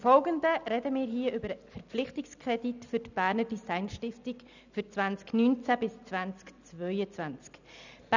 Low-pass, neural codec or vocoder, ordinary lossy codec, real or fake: 7.2 kHz; none; none; real